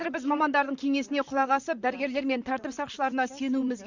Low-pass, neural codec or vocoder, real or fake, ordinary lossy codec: 7.2 kHz; vocoder, 44.1 kHz, 128 mel bands, Pupu-Vocoder; fake; Opus, 64 kbps